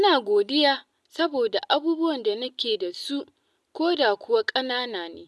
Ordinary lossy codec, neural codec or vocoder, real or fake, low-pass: none; none; real; none